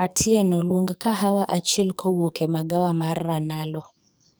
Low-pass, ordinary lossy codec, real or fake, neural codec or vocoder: none; none; fake; codec, 44.1 kHz, 2.6 kbps, SNAC